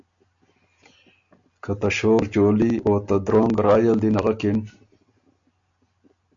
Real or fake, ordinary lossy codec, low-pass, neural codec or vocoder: real; AAC, 64 kbps; 7.2 kHz; none